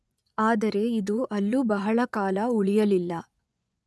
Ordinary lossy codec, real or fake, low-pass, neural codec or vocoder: none; fake; none; vocoder, 24 kHz, 100 mel bands, Vocos